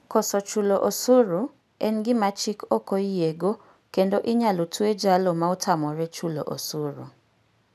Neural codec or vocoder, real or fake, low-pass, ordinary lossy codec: none; real; 14.4 kHz; none